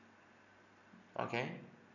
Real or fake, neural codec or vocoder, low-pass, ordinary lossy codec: real; none; 7.2 kHz; none